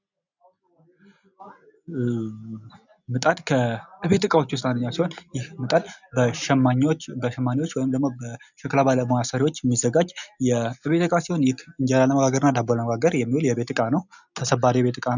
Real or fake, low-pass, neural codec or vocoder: real; 7.2 kHz; none